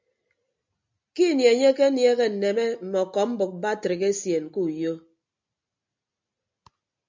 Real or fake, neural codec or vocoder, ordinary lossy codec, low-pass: real; none; MP3, 64 kbps; 7.2 kHz